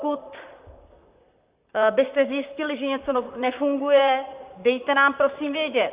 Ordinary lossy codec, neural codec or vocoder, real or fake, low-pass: Opus, 64 kbps; vocoder, 44.1 kHz, 128 mel bands, Pupu-Vocoder; fake; 3.6 kHz